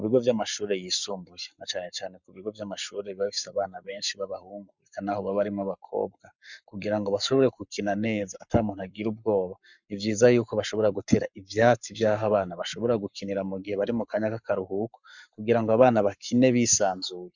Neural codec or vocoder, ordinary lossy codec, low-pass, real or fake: codec, 44.1 kHz, 7.8 kbps, Pupu-Codec; Opus, 64 kbps; 7.2 kHz; fake